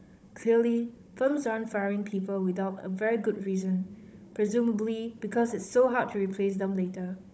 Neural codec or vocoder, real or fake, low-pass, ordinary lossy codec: codec, 16 kHz, 16 kbps, FunCodec, trained on Chinese and English, 50 frames a second; fake; none; none